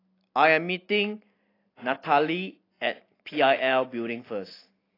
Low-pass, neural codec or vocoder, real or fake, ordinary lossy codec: 5.4 kHz; none; real; AAC, 24 kbps